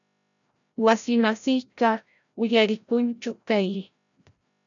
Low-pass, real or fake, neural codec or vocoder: 7.2 kHz; fake; codec, 16 kHz, 0.5 kbps, FreqCodec, larger model